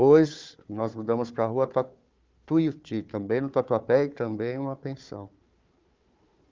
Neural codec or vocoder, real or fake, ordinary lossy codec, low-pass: codec, 16 kHz, 4 kbps, FunCodec, trained on Chinese and English, 50 frames a second; fake; Opus, 24 kbps; 7.2 kHz